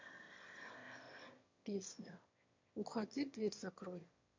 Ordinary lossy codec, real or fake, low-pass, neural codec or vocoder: MP3, 48 kbps; fake; 7.2 kHz; autoencoder, 22.05 kHz, a latent of 192 numbers a frame, VITS, trained on one speaker